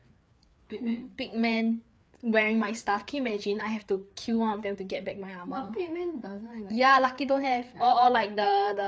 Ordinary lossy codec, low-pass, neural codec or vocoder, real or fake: none; none; codec, 16 kHz, 4 kbps, FreqCodec, larger model; fake